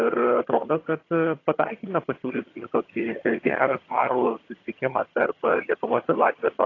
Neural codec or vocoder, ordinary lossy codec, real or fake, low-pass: vocoder, 22.05 kHz, 80 mel bands, HiFi-GAN; AAC, 32 kbps; fake; 7.2 kHz